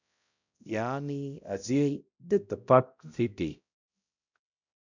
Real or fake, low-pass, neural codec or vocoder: fake; 7.2 kHz; codec, 16 kHz, 0.5 kbps, X-Codec, HuBERT features, trained on balanced general audio